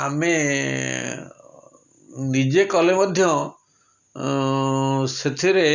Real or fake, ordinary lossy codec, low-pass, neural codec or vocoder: real; none; 7.2 kHz; none